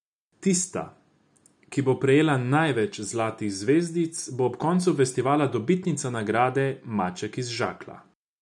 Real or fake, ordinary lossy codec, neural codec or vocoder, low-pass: real; none; none; 10.8 kHz